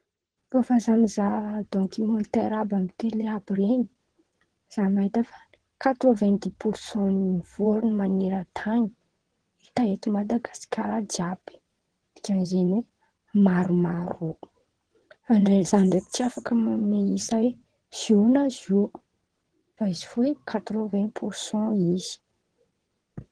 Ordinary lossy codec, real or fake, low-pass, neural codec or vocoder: Opus, 16 kbps; fake; 9.9 kHz; vocoder, 22.05 kHz, 80 mel bands, WaveNeXt